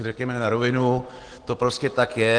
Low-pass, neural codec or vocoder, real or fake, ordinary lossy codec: 9.9 kHz; none; real; Opus, 16 kbps